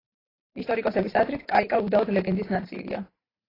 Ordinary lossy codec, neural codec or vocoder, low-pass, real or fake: AAC, 24 kbps; vocoder, 44.1 kHz, 128 mel bands every 512 samples, BigVGAN v2; 5.4 kHz; fake